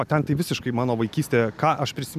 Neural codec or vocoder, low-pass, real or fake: none; 14.4 kHz; real